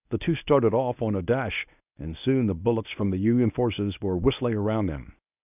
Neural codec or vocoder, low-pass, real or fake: codec, 24 kHz, 0.9 kbps, WavTokenizer, medium speech release version 1; 3.6 kHz; fake